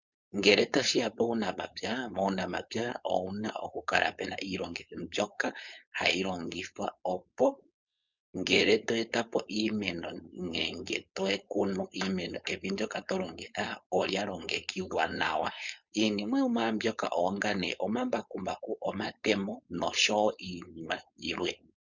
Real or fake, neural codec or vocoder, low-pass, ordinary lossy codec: fake; codec, 16 kHz, 4.8 kbps, FACodec; 7.2 kHz; Opus, 64 kbps